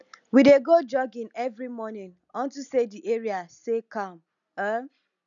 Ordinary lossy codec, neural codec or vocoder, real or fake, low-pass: none; none; real; 7.2 kHz